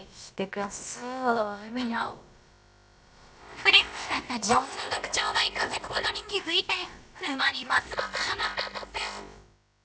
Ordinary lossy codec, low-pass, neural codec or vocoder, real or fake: none; none; codec, 16 kHz, about 1 kbps, DyCAST, with the encoder's durations; fake